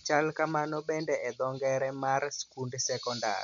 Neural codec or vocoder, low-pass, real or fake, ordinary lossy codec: none; 7.2 kHz; real; none